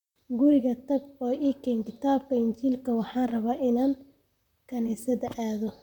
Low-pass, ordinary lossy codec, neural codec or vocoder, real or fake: 19.8 kHz; none; vocoder, 44.1 kHz, 128 mel bands, Pupu-Vocoder; fake